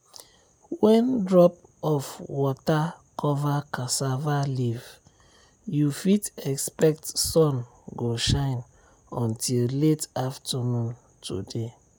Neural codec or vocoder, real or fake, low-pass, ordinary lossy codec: none; real; none; none